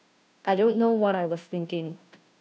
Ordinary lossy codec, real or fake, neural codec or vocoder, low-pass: none; fake; codec, 16 kHz, 0.5 kbps, FunCodec, trained on Chinese and English, 25 frames a second; none